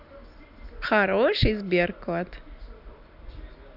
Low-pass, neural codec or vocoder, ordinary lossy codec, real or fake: 5.4 kHz; none; none; real